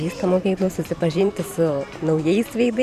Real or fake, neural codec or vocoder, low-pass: fake; vocoder, 44.1 kHz, 128 mel bands every 512 samples, BigVGAN v2; 14.4 kHz